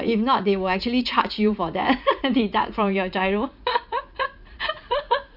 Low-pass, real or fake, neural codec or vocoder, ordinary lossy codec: 5.4 kHz; fake; vocoder, 44.1 kHz, 80 mel bands, Vocos; none